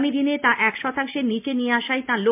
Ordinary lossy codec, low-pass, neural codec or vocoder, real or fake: MP3, 32 kbps; 3.6 kHz; none; real